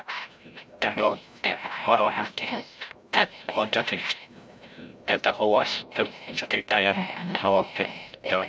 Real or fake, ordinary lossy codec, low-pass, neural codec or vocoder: fake; none; none; codec, 16 kHz, 0.5 kbps, FreqCodec, larger model